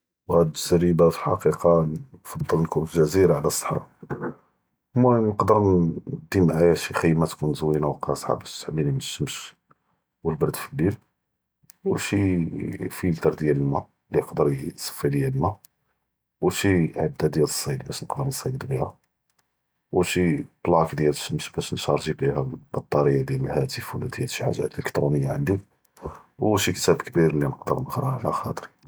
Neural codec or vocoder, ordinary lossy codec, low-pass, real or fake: none; none; none; real